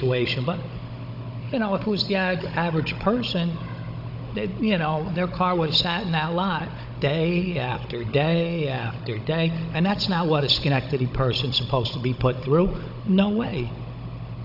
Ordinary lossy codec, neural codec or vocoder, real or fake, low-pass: AAC, 48 kbps; codec, 16 kHz, 16 kbps, FunCodec, trained on Chinese and English, 50 frames a second; fake; 5.4 kHz